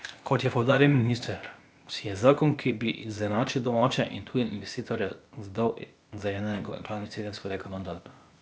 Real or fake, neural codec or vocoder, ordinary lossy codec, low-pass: fake; codec, 16 kHz, 0.8 kbps, ZipCodec; none; none